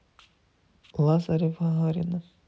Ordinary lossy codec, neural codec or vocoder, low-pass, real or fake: none; none; none; real